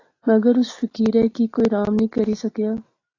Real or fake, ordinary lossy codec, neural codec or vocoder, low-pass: real; AAC, 32 kbps; none; 7.2 kHz